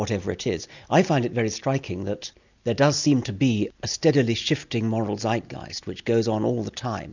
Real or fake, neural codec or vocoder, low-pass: real; none; 7.2 kHz